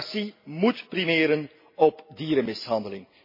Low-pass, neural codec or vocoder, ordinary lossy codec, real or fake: 5.4 kHz; none; MP3, 24 kbps; real